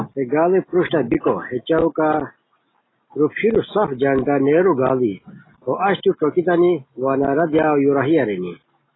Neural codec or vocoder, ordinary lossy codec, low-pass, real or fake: none; AAC, 16 kbps; 7.2 kHz; real